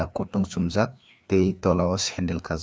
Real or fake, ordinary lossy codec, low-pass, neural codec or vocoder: fake; none; none; codec, 16 kHz, 2 kbps, FreqCodec, larger model